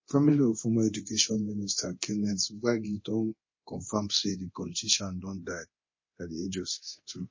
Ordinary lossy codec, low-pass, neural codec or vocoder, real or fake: MP3, 32 kbps; 7.2 kHz; codec, 24 kHz, 0.9 kbps, DualCodec; fake